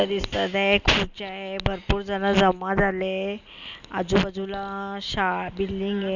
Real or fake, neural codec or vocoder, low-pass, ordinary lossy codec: real; none; 7.2 kHz; none